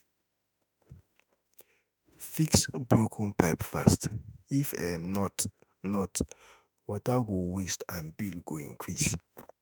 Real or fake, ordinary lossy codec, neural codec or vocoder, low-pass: fake; none; autoencoder, 48 kHz, 32 numbers a frame, DAC-VAE, trained on Japanese speech; none